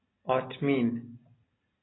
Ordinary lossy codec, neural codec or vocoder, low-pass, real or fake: AAC, 16 kbps; none; 7.2 kHz; real